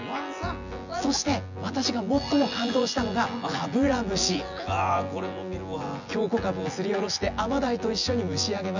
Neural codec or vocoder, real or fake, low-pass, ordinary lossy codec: vocoder, 24 kHz, 100 mel bands, Vocos; fake; 7.2 kHz; none